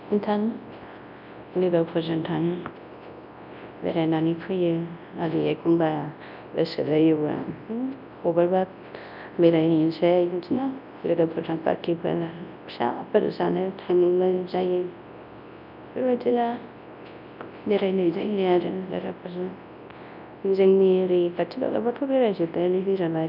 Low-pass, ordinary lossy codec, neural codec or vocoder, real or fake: 5.4 kHz; none; codec, 24 kHz, 0.9 kbps, WavTokenizer, large speech release; fake